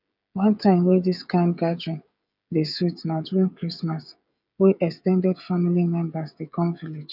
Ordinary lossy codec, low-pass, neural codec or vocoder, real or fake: none; 5.4 kHz; codec, 16 kHz, 16 kbps, FreqCodec, smaller model; fake